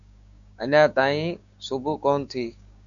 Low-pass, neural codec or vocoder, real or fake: 7.2 kHz; codec, 16 kHz, 6 kbps, DAC; fake